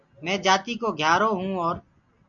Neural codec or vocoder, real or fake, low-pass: none; real; 7.2 kHz